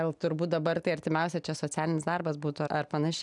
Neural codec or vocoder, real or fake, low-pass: none; real; 10.8 kHz